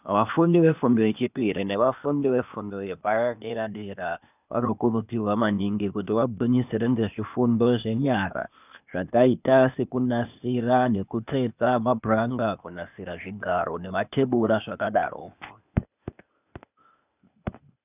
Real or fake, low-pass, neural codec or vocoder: fake; 3.6 kHz; codec, 16 kHz, 0.8 kbps, ZipCodec